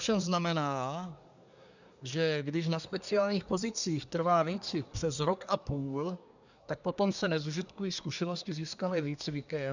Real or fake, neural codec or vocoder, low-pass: fake; codec, 24 kHz, 1 kbps, SNAC; 7.2 kHz